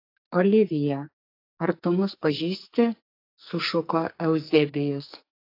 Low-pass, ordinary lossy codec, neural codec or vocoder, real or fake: 5.4 kHz; AAC, 32 kbps; codec, 44.1 kHz, 2.6 kbps, SNAC; fake